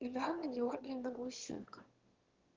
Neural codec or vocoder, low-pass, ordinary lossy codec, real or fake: autoencoder, 22.05 kHz, a latent of 192 numbers a frame, VITS, trained on one speaker; 7.2 kHz; Opus, 16 kbps; fake